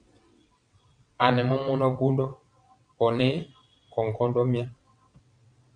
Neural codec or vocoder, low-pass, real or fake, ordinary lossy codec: vocoder, 22.05 kHz, 80 mel bands, WaveNeXt; 9.9 kHz; fake; MP3, 64 kbps